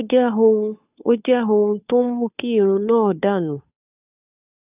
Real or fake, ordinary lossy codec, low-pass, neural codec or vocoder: fake; none; 3.6 kHz; codec, 16 kHz, 2 kbps, FunCodec, trained on Chinese and English, 25 frames a second